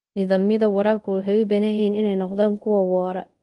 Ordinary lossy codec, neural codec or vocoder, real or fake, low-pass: Opus, 24 kbps; codec, 24 kHz, 0.5 kbps, DualCodec; fake; 10.8 kHz